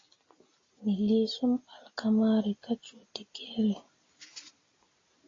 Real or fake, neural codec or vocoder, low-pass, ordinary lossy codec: real; none; 7.2 kHz; AAC, 32 kbps